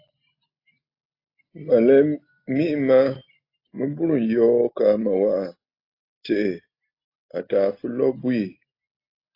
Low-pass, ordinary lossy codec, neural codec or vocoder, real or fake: 5.4 kHz; AAC, 32 kbps; none; real